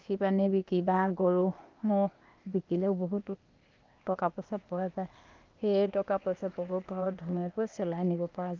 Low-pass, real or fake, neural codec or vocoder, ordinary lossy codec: 7.2 kHz; fake; codec, 16 kHz, 0.8 kbps, ZipCodec; Opus, 24 kbps